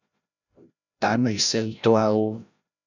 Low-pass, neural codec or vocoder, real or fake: 7.2 kHz; codec, 16 kHz, 0.5 kbps, FreqCodec, larger model; fake